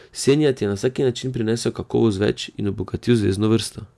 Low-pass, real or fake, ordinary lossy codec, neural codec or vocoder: none; real; none; none